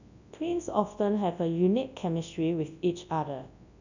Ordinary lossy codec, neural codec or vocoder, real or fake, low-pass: none; codec, 24 kHz, 0.9 kbps, WavTokenizer, large speech release; fake; 7.2 kHz